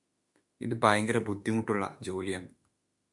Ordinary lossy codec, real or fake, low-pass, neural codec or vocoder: MP3, 64 kbps; fake; 10.8 kHz; autoencoder, 48 kHz, 32 numbers a frame, DAC-VAE, trained on Japanese speech